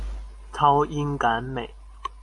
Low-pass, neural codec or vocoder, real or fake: 10.8 kHz; none; real